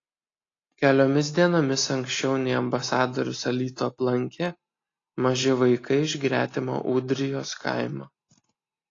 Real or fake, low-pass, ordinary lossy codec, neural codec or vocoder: real; 7.2 kHz; AAC, 32 kbps; none